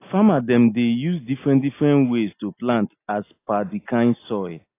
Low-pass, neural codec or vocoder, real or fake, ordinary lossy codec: 3.6 kHz; none; real; AAC, 24 kbps